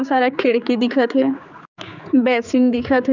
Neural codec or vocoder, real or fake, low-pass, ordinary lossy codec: codec, 16 kHz, 4 kbps, X-Codec, HuBERT features, trained on general audio; fake; 7.2 kHz; none